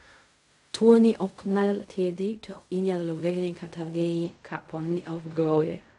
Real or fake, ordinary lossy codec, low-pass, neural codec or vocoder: fake; none; 10.8 kHz; codec, 16 kHz in and 24 kHz out, 0.4 kbps, LongCat-Audio-Codec, fine tuned four codebook decoder